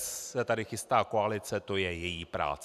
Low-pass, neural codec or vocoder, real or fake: 14.4 kHz; none; real